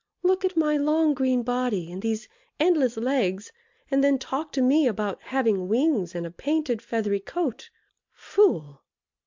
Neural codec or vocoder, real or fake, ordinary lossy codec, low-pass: none; real; MP3, 64 kbps; 7.2 kHz